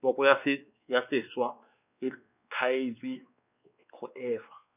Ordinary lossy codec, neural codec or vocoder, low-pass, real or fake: none; codec, 16 kHz, 2 kbps, X-Codec, WavLM features, trained on Multilingual LibriSpeech; 3.6 kHz; fake